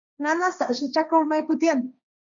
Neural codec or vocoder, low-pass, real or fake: codec, 16 kHz, 1.1 kbps, Voila-Tokenizer; 7.2 kHz; fake